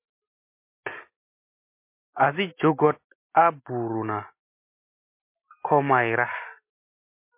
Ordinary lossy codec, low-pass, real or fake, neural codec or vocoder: MP3, 24 kbps; 3.6 kHz; real; none